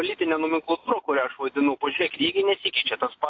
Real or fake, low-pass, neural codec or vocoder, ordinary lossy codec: real; 7.2 kHz; none; AAC, 48 kbps